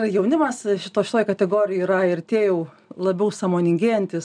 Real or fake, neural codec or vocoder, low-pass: real; none; 9.9 kHz